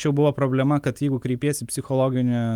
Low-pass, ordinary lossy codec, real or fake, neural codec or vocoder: 19.8 kHz; Opus, 32 kbps; real; none